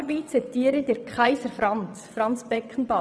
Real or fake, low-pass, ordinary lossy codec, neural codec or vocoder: fake; none; none; vocoder, 22.05 kHz, 80 mel bands, WaveNeXt